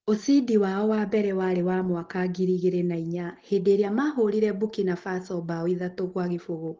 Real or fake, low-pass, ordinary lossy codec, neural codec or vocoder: real; 7.2 kHz; Opus, 16 kbps; none